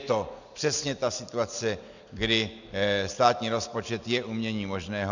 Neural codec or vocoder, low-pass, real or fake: none; 7.2 kHz; real